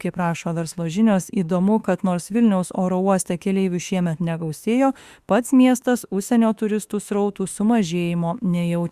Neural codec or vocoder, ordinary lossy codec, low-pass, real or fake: autoencoder, 48 kHz, 32 numbers a frame, DAC-VAE, trained on Japanese speech; Opus, 64 kbps; 14.4 kHz; fake